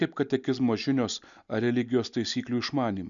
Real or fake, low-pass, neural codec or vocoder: real; 7.2 kHz; none